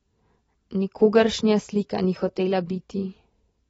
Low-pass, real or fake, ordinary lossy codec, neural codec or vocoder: 19.8 kHz; real; AAC, 24 kbps; none